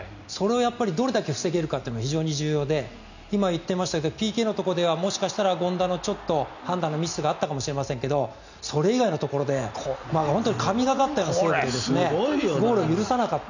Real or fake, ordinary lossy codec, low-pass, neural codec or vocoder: real; none; 7.2 kHz; none